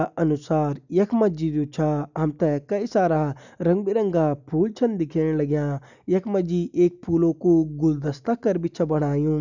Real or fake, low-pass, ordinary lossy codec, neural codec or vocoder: real; 7.2 kHz; none; none